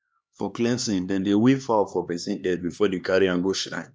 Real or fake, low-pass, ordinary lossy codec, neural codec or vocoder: fake; none; none; codec, 16 kHz, 2 kbps, X-Codec, HuBERT features, trained on LibriSpeech